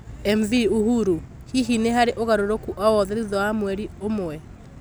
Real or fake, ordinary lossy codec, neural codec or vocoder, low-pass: real; none; none; none